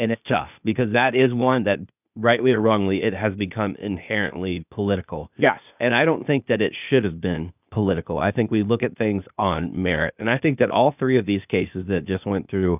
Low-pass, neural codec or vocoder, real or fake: 3.6 kHz; codec, 16 kHz, 0.8 kbps, ZipCodec; fake